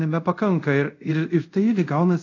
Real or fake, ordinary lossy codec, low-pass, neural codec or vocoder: fake; AAC, 48 kbps; 7.2 kHz; codec, 24 kHz, 0.5 kbps, DualCodec